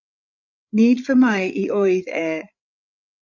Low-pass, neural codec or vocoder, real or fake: 7.2 kHz; codec, 16 kHz, 8 kbps, FreqCodec, larger model; fake